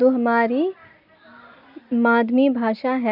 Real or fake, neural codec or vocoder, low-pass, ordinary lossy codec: real; none; 5.4 kHz; none